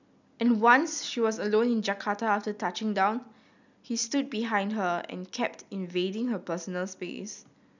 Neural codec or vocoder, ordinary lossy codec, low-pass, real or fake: none; none; 7.2 kHz; real